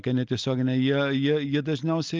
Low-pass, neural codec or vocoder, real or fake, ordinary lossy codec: 7.2 kHz; none; real; Opus, 32 kbps